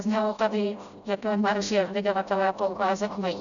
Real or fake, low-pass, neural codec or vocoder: fake; 7.2 kHz; codec, 16 kHz, 0.5 kbps, FreqCodec, smaller model